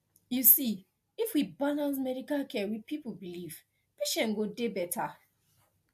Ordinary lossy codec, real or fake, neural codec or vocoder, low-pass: AAC, 96 kbps; real; none; 14.4 kHz